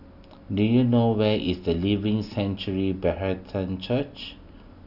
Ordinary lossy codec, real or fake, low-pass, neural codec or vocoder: none; real; 5.4 kHz; none